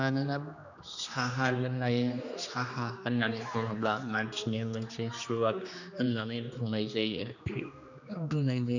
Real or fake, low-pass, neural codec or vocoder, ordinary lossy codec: fake; 7.2 kHz; codec, 16 kHz, 2 kbps, X-Codec, HuBERT features, trained on general audio; none